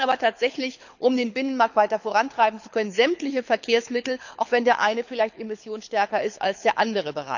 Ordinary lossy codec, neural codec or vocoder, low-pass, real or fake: none; codec, 24 kHz, 6 kbps, HILCodec; 7.2 kHz; fake